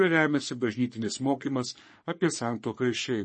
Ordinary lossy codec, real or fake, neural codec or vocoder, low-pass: MP3, 32 kbps; fake; codec, 44.1 kHz, 3.4 kbps, Pupu-Codec; 10.8 kHz